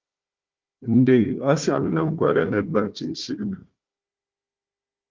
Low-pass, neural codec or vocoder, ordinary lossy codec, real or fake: 7.2 kHz; codec, 16 kHz, 1 kbps, FunCodec, trained on Chinese and English, 50 frames a second; Opus, 32 kbps; fake